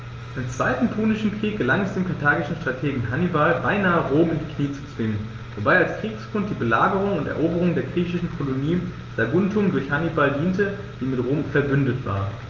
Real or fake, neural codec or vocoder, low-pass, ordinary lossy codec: real; none; 7.2 kHz; Opus, 24 kbps